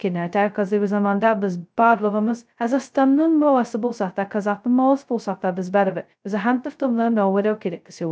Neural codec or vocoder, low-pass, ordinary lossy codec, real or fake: codec, 16 kHz, 0.2 kbps, FocalCodec; none; none; fake